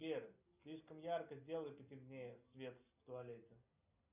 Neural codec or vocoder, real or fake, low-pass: none; real; 3.6 kHz